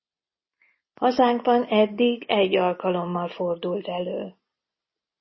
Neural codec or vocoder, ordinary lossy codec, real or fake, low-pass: none; MP3, 24 kbps; real; 7.2 kHz